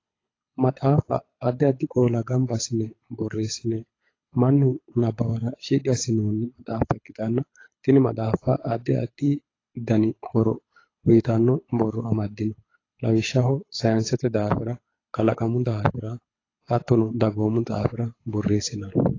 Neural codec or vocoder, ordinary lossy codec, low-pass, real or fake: codec, 24 kHz, 6 kbps, HILCodec; AAC, 32 kbps; 7.2 kHz; fake